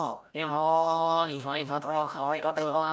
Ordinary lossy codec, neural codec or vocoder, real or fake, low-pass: none; codec, 16 kHz, 0.5 kbps, FreqCodec, larger model; fake; none